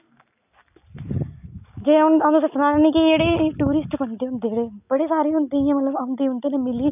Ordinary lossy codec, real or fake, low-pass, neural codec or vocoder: none; real; 3.6 kHz; none